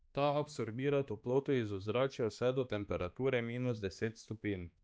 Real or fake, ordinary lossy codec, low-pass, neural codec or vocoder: fake; none; none; codec, 16 kHz, 2 kbps, X-Codec, HuBERT features, trained on balanced general audio